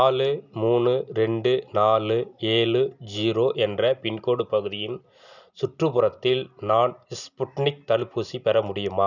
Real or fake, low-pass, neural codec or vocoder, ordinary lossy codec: real; 7.2 kHz; none; none